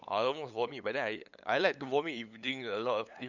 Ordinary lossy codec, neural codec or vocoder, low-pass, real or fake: none; codec, 16 kHz, 8 kbps, FunCodec, trained on LibriTTS, 25 frames a second; 7.2 kHz; fake